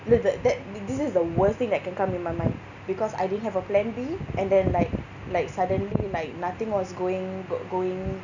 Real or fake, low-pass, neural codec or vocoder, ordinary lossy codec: real; 7.2 kHz; none; none